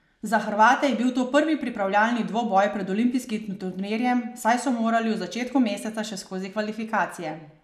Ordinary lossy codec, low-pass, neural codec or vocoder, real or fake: none; 14.4 kHz; none; real